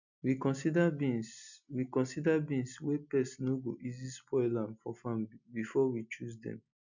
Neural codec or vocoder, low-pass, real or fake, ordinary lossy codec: none; 7.2 kHz; real; none